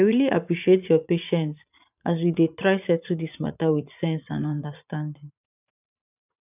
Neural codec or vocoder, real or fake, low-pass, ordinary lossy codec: vocoder, 44.1 kHz, 80 mel bands, Vocos; fake; 3.6 kHz; none